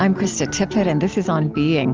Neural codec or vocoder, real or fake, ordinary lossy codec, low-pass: none; real; Opus, 24 kbps; 7.2 kHz